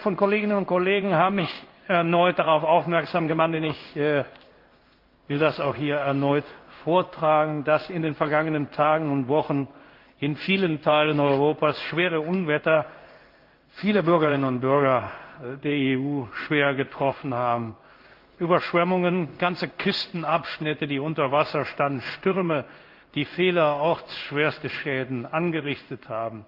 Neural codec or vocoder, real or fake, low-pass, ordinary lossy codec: codec, 16 kHz in and 24 kHz out, 1 kbps, XY-Tokenizer; fake; 5.4 kHz; Opus, 32 kbps